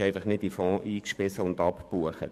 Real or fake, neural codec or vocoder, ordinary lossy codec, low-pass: fake; codec, 44.1 kHz, 7.8 kbps, DAC; MP3, 64 kbps; 14.4 kHz